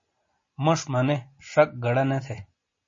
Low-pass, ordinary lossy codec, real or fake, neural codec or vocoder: 7.2 kHz; MP3, 32 kbps; real; none